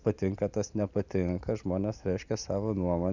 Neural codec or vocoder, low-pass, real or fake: none; 7.2 kHz; real